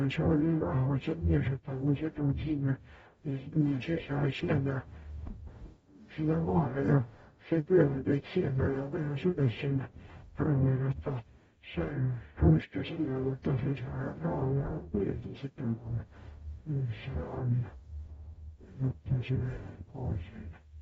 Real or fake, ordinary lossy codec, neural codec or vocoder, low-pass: fake; AAC, 24 kbps; codec, 44.1 kHz, 0.9 kbps, DAC; 19.8 kHz